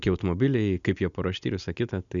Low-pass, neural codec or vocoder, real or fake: 7.2 kHz; none; real